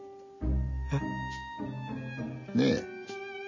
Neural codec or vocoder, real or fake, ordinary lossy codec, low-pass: none; real; none; 7.2 kHz